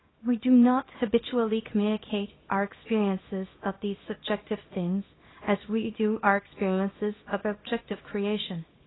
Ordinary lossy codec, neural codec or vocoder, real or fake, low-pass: AAC, 16 kbps; codec, 24 kHz, 0.9 kbps, WavTokenizer, small release; fake; 7.2 kHz